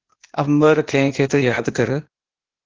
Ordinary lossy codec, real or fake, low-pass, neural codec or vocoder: Opus, 16 kbps; fake; 7.2 kHz; codec, 16 kHz, 0.8 kbps, ZipCodec